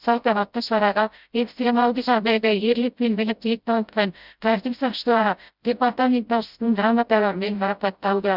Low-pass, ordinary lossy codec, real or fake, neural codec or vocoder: 5.4 kHz; Opus, 64 kbps; fake; codec, 16 kHz, 0.5 kbps, FreqCodec, smaller model